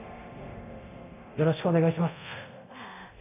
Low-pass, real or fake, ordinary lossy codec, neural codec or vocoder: 3.6 kHz; fake; none; codec, 24 kHz, 0.9 kbps, DualCodec